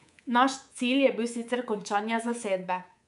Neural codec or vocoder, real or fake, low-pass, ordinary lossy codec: codec, 24 kHz, 3.1 kbps, DualCodec; fake; 10.8 kHz; none